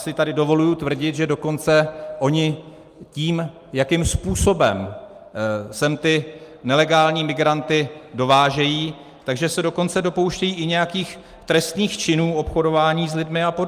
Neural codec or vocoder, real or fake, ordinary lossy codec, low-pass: none; real; Opus, 32 kbps; 14.4 kHz